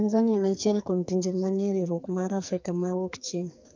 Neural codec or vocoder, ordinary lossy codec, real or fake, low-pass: codec, 44.1 kHz, 2.6 kbps, SNAC; MP3, 64 kbps; fake; 7.2 kHz